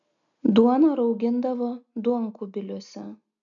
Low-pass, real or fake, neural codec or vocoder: 7.2 kHz; real; none